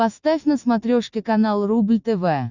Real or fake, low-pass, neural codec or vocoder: real; 7.2 kHz; none